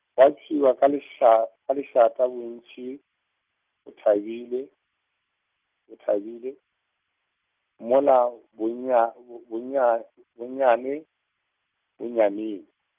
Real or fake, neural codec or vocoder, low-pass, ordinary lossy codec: real; none; 3.6 kHz; Opus, 24 kbps